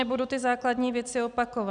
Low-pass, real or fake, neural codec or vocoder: 9.9 kHz; real; none